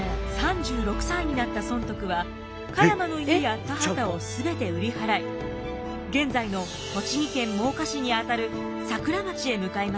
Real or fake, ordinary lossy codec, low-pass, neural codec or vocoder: real; none; none; none